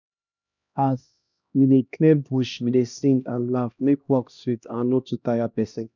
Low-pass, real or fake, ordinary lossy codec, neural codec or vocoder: 7.2 kHz; fake; none; codec, 16 kHz, 1 kbps, X-Codec, HuBERT features, trained on LibriSpeech